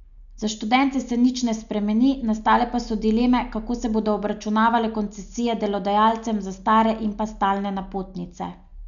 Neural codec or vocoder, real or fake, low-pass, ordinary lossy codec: none; real; 7.2 kHz; none